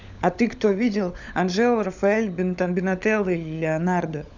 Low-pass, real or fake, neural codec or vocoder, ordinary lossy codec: 7.2 kHz; fake; codec, 16 kHz, 16 kbps, FunCodec, trained on LibriTTS, 50 frames a second; none